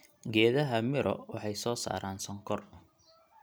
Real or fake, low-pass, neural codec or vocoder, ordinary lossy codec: real; none; none; none